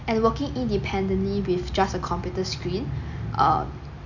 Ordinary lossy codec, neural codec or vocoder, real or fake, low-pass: none; none; real; 7.2 kHz